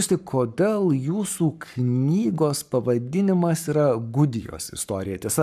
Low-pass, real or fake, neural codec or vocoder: 14.4 kHz; fake; codec, 44.1 kHz, 7.8 kbps, Pupu-Codec